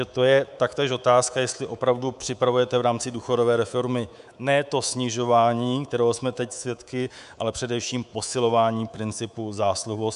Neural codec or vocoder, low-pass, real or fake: codec, 24 kHz, 3.1 kbps, DualCodec; 10.8 kHz; fake